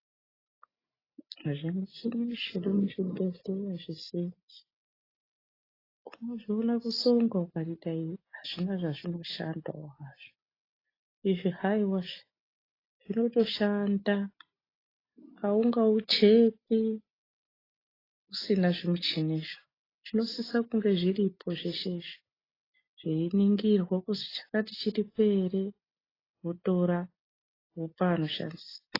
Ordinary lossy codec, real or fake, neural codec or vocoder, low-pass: AAC, 24 kbps; real; none; 5.4 kHz